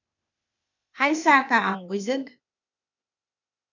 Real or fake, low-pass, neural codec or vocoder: fake; 7.2 kHz; codec, 16 kHz, 0.8 kbps, ZipCodec